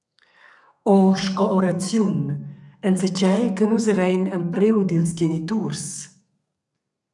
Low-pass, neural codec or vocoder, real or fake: 10.8 kHz; codec, 32 kHz, 1.9 kbps, SNAC; fake